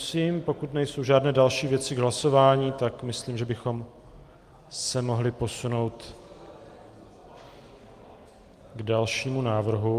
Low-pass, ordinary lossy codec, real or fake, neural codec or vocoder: 14.4 kHz; Opus, 32 kbps; real; none